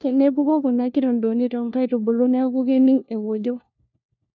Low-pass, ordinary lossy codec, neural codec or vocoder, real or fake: 7.2 kHz; none; codec, 16 kHz, 1 kbps, FunCodec, trained on LibriTTS, 50 frames a second; fake